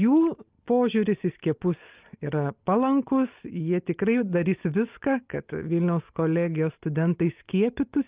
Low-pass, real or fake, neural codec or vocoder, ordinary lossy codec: 3.6 kHz; real; none; Opus, 24 kbps